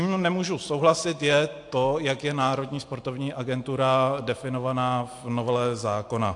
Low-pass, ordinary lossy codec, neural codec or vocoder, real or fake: 10.8 kHz; AAC, 64 kbps; none; real